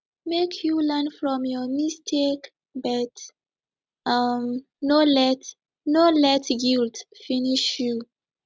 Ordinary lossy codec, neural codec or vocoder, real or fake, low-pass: none; none; real; none